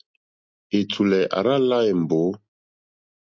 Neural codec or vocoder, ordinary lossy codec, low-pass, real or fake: none; MP3, 48 kbps; 7.2 kHz; real